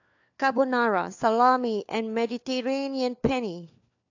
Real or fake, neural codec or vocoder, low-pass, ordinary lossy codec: fake; codec, 16 kHz, 4 kbps, FunCodec, trained on LibriTTS, 50 frames a second; 7.2 kHz; AAC, 48 kbps